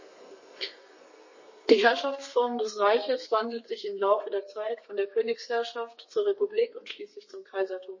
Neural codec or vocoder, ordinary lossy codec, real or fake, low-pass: codec, 32 kHz, 1.9 kbps, SNAC; MP3, 32 kbps; fake; 7.2 kHz